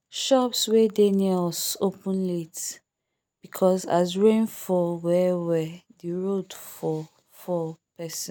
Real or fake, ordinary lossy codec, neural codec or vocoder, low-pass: real; none; none; none